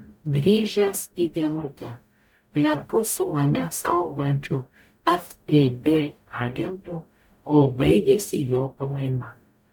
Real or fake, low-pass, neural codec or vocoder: fake; 19.8 kHz; codec, 44.1 kHz, 0.9 kbps, DAC